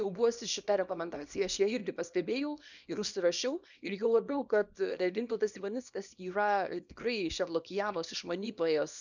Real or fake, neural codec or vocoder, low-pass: fake; codec, 24 kHz, 0.9 kbps, WavTokenizer, small release; 7.2 kHz